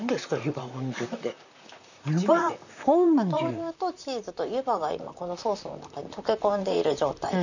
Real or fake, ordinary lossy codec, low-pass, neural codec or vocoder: fake; none; 7.2 kHz; vocoder, 44.1 kHz, 128 mel bands, Pupu-Vocoder